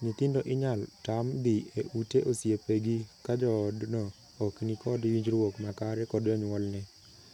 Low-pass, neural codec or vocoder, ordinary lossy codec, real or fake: 19.8 kHz; none; none; real